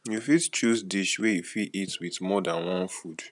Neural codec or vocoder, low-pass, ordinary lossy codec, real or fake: vocoder, 44.1 kHz, 128 mel bands every 512 samples, BigVGAN v2; 10.8 kHz; none; fake